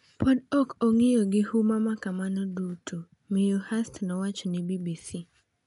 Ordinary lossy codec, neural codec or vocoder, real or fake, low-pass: MP3, 96 kbps; none; real; 10.8 kHz